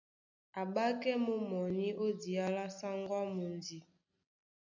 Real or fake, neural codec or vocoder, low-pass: real; none; 7.2 kHz